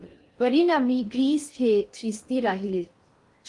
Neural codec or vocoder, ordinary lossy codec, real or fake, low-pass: codec, 16 kHz in and 24 kHz out, 0.6 kbps, FocalCodec, streaming, 4096 codes; Opus, 24 kbps; fake; 10.8 kHz